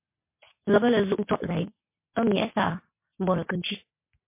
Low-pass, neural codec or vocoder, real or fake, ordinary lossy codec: 3.6 kHz; none; real; MP3, 24 kbps